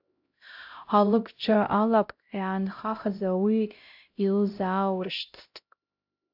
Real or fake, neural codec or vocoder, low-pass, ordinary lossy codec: fake; codec, 16 kHz, 0.5 kbps, X-Codec, HuBERT features, trained on LibriSpeech; 5.4 kHz; AAC, 48 kbps